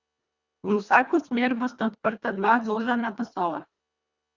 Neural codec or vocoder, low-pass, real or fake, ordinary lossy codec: codec, 24 kHz, 1.5 kbps, HILCodec; 7.2 kHz; fake; Opus, 64 kbps